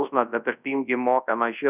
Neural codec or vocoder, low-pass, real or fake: codec, 24 kHz, 0.9 kbps, WavTokenizer, large speech release; 3.6 kHz; fake